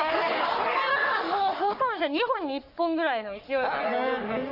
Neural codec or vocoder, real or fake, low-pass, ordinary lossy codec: codec, 44.1 kHz, 3.4 kbps, Pupu-Codec; fake; 5.4 kHz; none